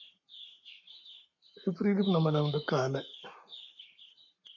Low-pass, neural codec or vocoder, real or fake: 7.2 kHz; codec, 44.1 kHz, 7.8 kbps, Pupu-Codec; fake